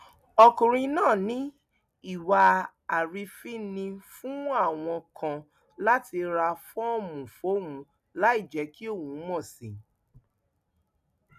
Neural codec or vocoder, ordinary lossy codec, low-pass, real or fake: none; none; 14.4 kHz; real